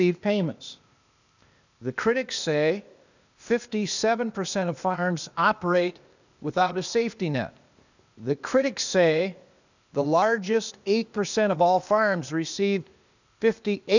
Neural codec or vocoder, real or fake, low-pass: codec, 16 kHz, 0.8 kbps, ZipCodec; fake; 7.2 kHz